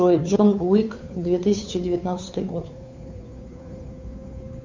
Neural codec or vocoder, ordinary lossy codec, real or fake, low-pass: codec, 16 kHz, 2 kbps, FunCodec, trained on Chinese and English, 25 frames a second; MP3, 64 kbps; fake; 7.2 kHz